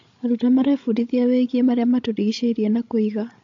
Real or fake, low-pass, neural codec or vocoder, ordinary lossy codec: fake; 7.2 kHz; codec, 16 kHz, 16 kbps, FreqCodec, larger model; AAC, 48 kbps